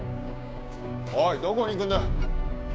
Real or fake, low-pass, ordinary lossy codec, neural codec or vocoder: fake; none; none; codec, 16 kHz, 6 kbps, DAC